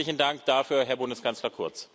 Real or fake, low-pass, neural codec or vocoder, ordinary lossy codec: real; none; none; none